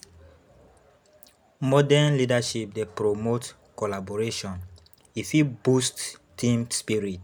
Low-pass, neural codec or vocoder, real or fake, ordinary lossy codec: none; none; real; none